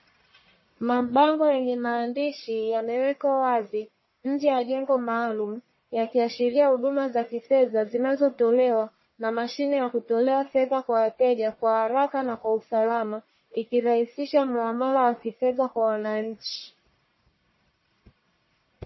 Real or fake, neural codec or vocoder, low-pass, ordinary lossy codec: fake; codec, 44.1 kHz, 1.7 kbps, Pupu-Codec; 7.2 kHz; MP3, 24 kbps